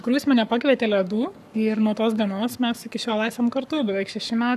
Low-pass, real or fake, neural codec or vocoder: 14.4 kHz; fake; codec, 44.1 kHz, 7.8 kbps, Pupu-Codec